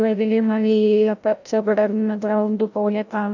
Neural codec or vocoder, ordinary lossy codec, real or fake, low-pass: codec, 16 kHz, 0.5 kbps, FreqCodec, larger model; none; fake; 7.2 kHz